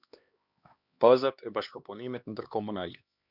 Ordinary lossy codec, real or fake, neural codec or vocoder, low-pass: Opus, 64 kbps; fake; codec, 16 kHz, 2 kbps, X-Codec, HuBERT features, trained on LibriSpeech; 5.4 kHz